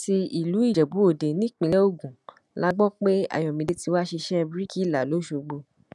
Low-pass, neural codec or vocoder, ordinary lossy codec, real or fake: none; none; none; real